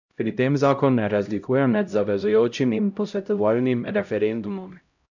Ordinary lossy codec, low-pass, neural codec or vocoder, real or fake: none; 7.2 kHz; codec, 16 kHz, 0.5 kbps, X-Codec, HuBERT features, trained on LibriSpeech; fake